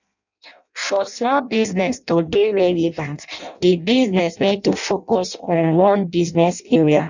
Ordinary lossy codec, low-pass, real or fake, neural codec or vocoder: none; 7.2 kHz; fake; codec, 16 kHz in and 24 kHz out, 0.6 kbps, FireRedTTS-2 codec